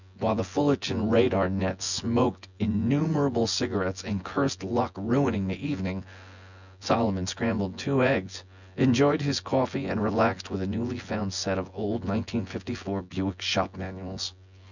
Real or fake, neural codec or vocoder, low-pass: fake; vocoder, 24 kHz, 100 mel bands, Vocos; 7.2 kHz